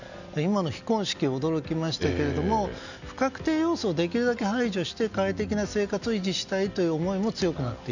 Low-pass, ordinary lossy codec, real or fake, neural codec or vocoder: 7.2 kHz; none; real; none